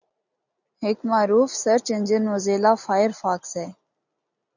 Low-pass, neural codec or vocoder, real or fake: 7.2 kHz; none; real